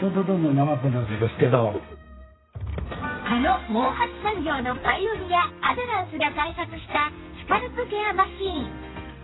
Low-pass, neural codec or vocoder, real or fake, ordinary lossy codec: 7.2 kHz; codec, 32 kHz, 1.9 kbps, SNAC; fake; AAC, 16 kbps